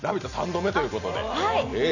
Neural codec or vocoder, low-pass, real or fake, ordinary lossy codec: none; 7.2 kHz; real; none